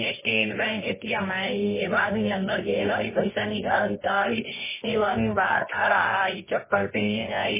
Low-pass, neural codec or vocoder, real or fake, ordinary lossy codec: 3.6 kHz; codec, 24 kHz, 0.9 kbps, WavTokenizer, medium music audio release; fake; MP3, 16 kbps